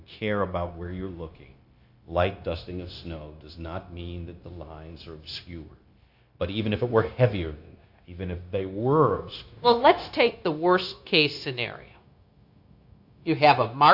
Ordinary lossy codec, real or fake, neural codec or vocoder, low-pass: AAC, 48 kbps; fake; codec, 16 kHz, 0.9 kbps, LongCat-Audio-Codec; 5.4 kHz